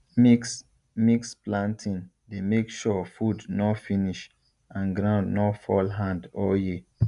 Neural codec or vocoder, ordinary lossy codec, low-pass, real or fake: none; none; 10.8 kHz; real